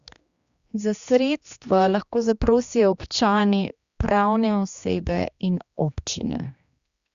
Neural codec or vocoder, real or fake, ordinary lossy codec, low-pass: codec, 16 kHz, 2 kbps, X-Codec, HuBERT features, trained on general audio; fake; Opus, 64 kbps; 7.2 kHz